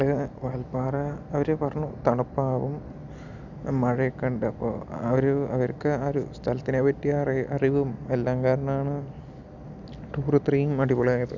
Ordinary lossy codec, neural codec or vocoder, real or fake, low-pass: none; none; real; 7.2 kHz